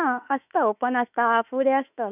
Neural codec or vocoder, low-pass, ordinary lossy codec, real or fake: autoencoder, 48 kHz, 32 numbers a frame, DAC-VAE, trained on Japanese speech; 3.6 kHz; none; fake